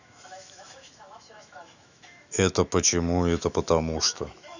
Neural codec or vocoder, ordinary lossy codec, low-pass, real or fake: autoencoder, 48 kHz, 128 numbers a frame, DAC-VAE, trained on Japanese speech; none; 7.2 kHz; fake